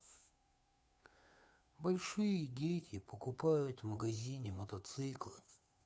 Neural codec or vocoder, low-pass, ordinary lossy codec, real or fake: codec, 16 kHz, 2 kbps, FunCodec, trained on Chinese and English, 25 frames a second; none; none; fake